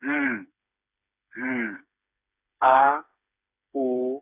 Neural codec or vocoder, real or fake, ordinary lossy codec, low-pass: codec, 16 kHz, 4 kbps, FreqCodec, smaller model; fake; none; 3.6 kHz